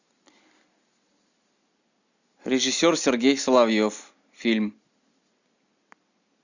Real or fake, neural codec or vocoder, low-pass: real; none; 7.2 kHz